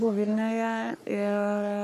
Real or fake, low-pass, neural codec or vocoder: fake; 14.4 kHz; codec, 44.1 kHz, 3.4 kbps, Pupu-Codec